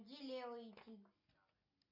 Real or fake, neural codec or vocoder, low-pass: real; none; 5.4 kHz